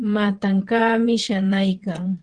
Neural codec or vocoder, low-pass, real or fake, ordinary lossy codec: vocoder, 22.05 kHz, 80 mel bands, WaveNeXt; 9.9 kHz; fake; Opus, 16 kbps